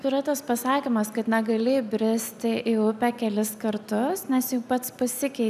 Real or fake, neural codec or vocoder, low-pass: real; none; 14.4 kHz